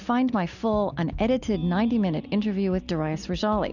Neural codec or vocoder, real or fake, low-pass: none; real; 7.2 kHz